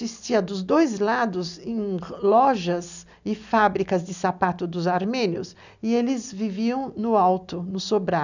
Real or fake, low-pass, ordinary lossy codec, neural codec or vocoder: real; 7.2 kHz; none; none